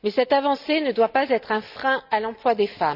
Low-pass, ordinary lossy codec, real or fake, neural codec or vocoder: 5.4 kHz; none; real; none